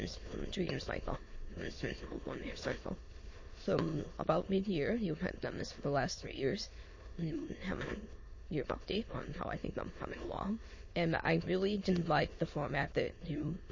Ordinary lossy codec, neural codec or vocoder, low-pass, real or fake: MP3, 32 kbps; autoencoder, 22.05 kHz, a latent of 192 numbers a frame, VITS, trained on many speakers; 7.2 kHz; fake